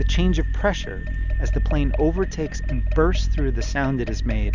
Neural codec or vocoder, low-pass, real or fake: none; 7.2 kHz; real